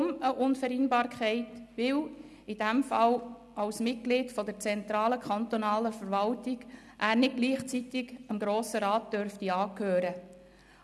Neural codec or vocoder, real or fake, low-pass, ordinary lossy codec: none; real; none; none